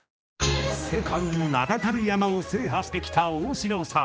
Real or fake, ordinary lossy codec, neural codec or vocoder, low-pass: fake; none; codec, 16 kHz, 1 kbps, X-Codec, HuBERT features, trained on general audio; none